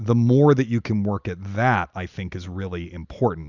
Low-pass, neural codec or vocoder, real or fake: 7.2 kHz; none; real